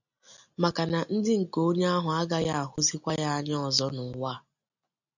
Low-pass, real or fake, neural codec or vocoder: 7.2 kHz; real; none